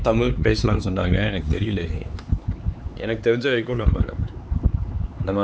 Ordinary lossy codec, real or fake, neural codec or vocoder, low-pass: none; fake; codec, 16 kHz, 4 kbps, X-Codec, HuBERT features, trained on LibriSpeech; none